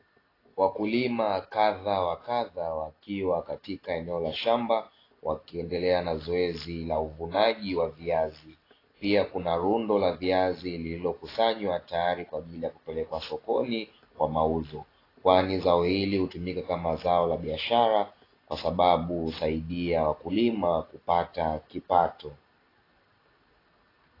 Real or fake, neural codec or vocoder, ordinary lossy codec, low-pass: real; none; AAC, 24 kbps; 5.4 kHz